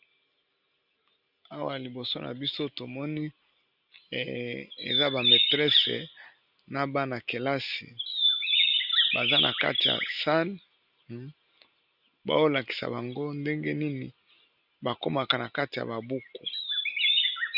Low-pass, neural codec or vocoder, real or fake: 5.4 kHz; none; real